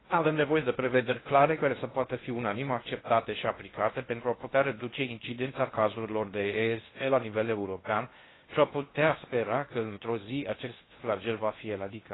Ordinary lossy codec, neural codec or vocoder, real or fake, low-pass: AAC, 16 kbps; codec, 16 kHz in and 24 kHz out, 0.6 kbps, FocalCodec, streaming, 2048 codes; fake; 7.2 kHz